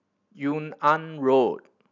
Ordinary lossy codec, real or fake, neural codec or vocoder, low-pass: none; real; none; 7.2 kHz